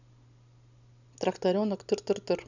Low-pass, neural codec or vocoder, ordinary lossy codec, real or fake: 7.2 kHz; none; none; real